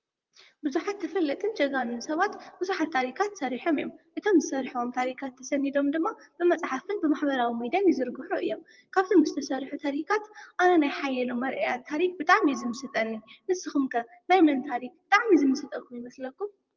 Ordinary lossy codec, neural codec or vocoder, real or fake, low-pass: Opus, 24 kbps; vocoder, 44.1 kHz, 128 mel bands, Pupu-Vocoder; fake; 7.2 kHz